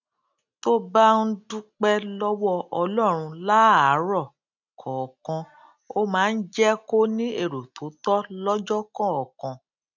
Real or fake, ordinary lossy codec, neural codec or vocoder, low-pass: real; none; none; 7.2 kHz